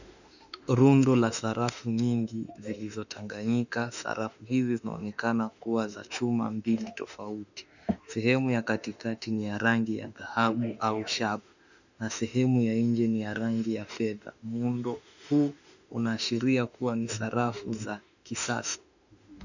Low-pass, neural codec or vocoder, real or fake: 7.2 kHz; autoencoder, 48 kHz, 32 numbers a frame, DAC-VAE, trained on Japanese speech; fake